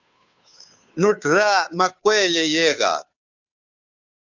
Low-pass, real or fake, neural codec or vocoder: 7.2 kHz; fake; codec, 16 kHz, 2 kbps, FunCodec, trained on Chinese and English, 25 frames a second